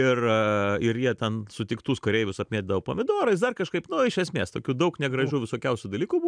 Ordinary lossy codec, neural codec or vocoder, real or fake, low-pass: MP3, 96 kbps; none; real; 9.9 kHz